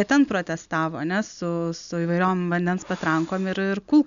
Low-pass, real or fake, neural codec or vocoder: 7.2 kHz; real; none